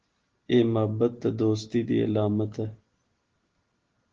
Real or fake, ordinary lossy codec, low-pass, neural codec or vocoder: real; Opus, 16 kbps; 7.2 kHz; none